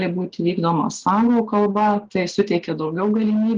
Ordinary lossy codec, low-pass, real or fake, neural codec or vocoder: Opus, 16 kbps; 7.2 kHz; real; none